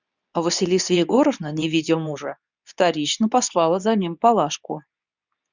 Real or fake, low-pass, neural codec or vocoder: fake; 7.2 kHz; codec, 24 kHz, 0.9 kbps, WavTokenizer, medium speech release version 2